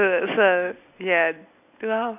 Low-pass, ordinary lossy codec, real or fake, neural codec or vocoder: 3.6 kHz; none; real; none